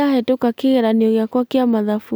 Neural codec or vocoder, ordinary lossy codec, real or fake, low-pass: none; none; real; none